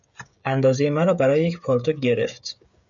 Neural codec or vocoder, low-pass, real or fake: codec, 16 kHz, 16 kbps, FreqCodec, smaller model; 7.2 kHz; fake